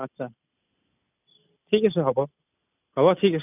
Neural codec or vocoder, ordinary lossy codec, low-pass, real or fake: none; none; 3.6 kHz; real